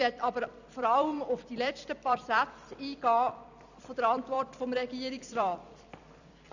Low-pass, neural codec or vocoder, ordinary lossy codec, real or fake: 7.2 kHz; vocoder, 44.1 kHz, 128 mel bands every 256 samples, BigVGAN v2; none; fake